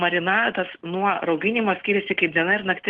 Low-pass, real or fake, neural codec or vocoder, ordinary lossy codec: 10.8 kHz; real; none; Opus, 16 kbps